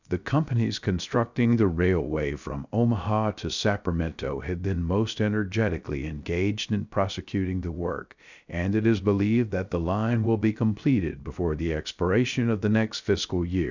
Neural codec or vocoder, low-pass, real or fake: codec, 16 kHz, 0.3 kbps, FocalCodec; 7.2 kHz; fake